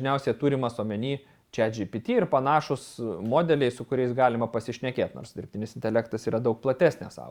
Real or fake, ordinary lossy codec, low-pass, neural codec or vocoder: fake; Opus, 64 kbps; 19.8 kHz; vocoder, 44.1 kHz, 128 mel bands every 512 samples, BigVGAN v2